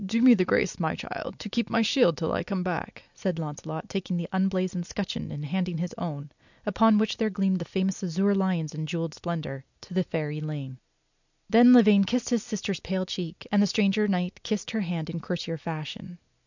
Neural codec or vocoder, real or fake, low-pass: none; real; 7.2 kHz